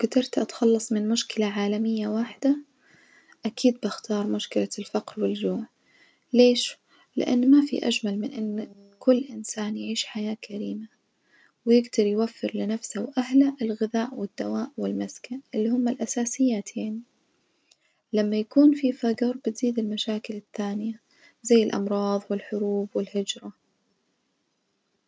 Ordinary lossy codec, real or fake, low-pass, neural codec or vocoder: none; real; none; none